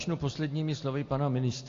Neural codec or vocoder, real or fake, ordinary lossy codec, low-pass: none; real; AAC, 48 kbps; 7.2 kHz